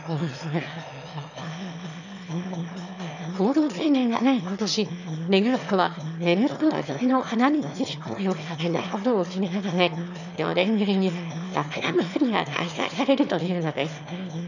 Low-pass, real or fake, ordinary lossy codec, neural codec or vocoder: 7.2 kHz; fake; none; autoencoder, 22.05 kHz, a latent of 192 numbers a frame, VITS, trained on one speaker